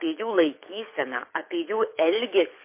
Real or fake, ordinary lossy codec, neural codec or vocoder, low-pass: fake; MP3, 24 kbps; codec, 24 kHz, 6 kbps, HILCodec; 3.6 kHz